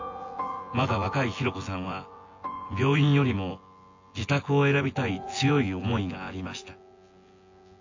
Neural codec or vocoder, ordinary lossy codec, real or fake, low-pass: vocoder, 24 kHz, 100 mel bands, Vocos; AAC, 48 kbps; fake; 7.2 kHz